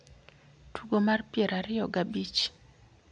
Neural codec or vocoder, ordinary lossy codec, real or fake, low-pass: none; none; real; 9.9 kHz